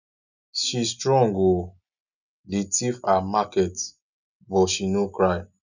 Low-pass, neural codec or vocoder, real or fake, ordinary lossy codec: 7.2 kHz; none; real; none